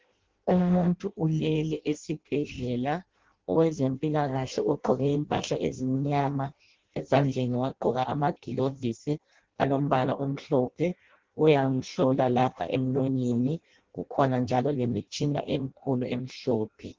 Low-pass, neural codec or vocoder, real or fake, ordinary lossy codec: 7.2 kHz; codec, 16 kHz in and 24 kHz out, 0.6 kbps, FireRedTTS-2 codec; fake; Opus, 16 kbps